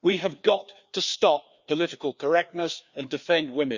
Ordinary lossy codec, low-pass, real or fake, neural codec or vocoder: Opus, 64 kbps; 7.2 kHz; fake; codec, 16 kHz, 2 kbps, FreqCodec, larger model